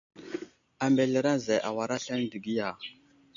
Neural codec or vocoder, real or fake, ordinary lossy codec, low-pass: none; real; AAC, 64 kbps; 7.2 kHz